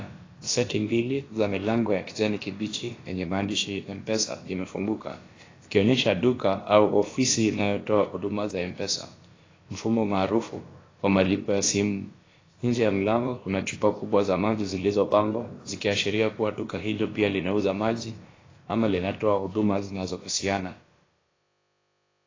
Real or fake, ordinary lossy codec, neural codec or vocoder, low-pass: fake; AAC, 32 kbps; codec, 16 kHz, about 1 kbps, DyCAST, with the encoder's durations; 7.2 kHz